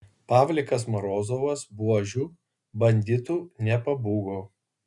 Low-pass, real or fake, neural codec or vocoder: 10.8 kHz; real; none